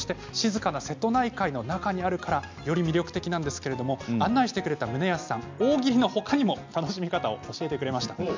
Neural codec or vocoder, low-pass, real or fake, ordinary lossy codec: none; 7.2 kHz; real; MP3, 64 kbps